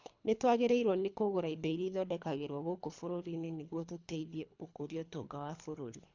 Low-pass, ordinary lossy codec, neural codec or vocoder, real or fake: 7.2 kHz; MP3, 64 kbps; codec, 24 kHz, 3 kbps, HILCodec; fake